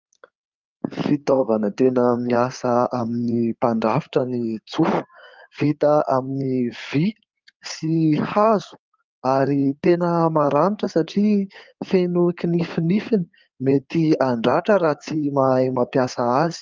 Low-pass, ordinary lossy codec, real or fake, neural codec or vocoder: 7.2 kHz; Opus, 24 kbps; fake; codec, 16 kHz in and 24 kHz out, 2.2 kbps, FireRedTTS-2 codec